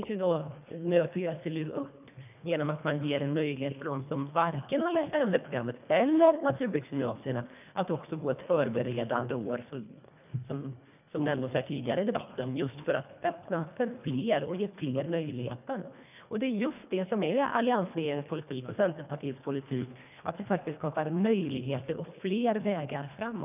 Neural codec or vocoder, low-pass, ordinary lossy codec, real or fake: codec, 24 kHz, 1.5 kbps, HILCodec; 3.6 kHz; none; fake